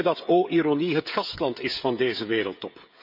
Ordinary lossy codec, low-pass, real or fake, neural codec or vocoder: none; 5.4 kHz; fake; codec, 16 kHz, 16 kbps, FreqCodec, smaller model